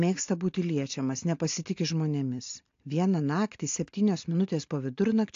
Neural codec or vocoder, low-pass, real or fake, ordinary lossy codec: none; 7.2 kHz; real; AAC, 48 kbps